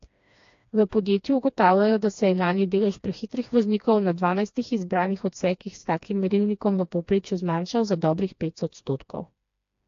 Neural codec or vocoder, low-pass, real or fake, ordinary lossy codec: codec, 16 kHz, 2 kbps, FreqCodec, smaller model; 7.2 kHz; fake; AAC, 48 kbps